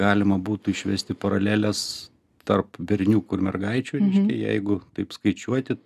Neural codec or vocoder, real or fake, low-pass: none; real; 14.4 kHz